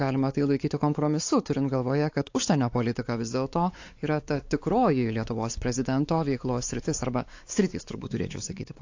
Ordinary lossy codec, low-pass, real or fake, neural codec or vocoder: AAC, 48 kbps; 7.2 kHz; fake; codec, 16 kHz, 4 kbps, X-Codec, WavLM features, trained on Multilingual LibriSpeech